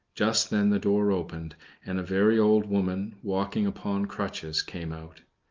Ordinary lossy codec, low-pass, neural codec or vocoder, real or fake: Opus, 32 kbps; 7.2 kHz; none; real